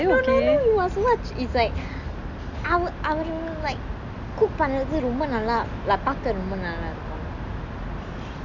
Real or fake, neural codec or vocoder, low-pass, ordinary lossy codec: real; none; 7.2 kHz; none